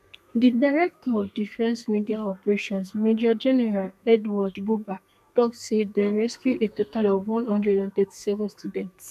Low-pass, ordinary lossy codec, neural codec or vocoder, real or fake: 14.4 kHz; none; codec, 32 kHz, 1.9 kbps, SNAC; fake